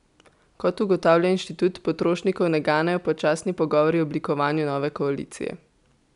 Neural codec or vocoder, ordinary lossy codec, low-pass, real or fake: none; none; 10.8 kHz; real